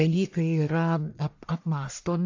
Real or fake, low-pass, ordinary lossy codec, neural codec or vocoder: fake; 7.2 kHz; AAC, 48 kbps; codec, 44.1 kHz, 1.7 kbps, Pupu-Codec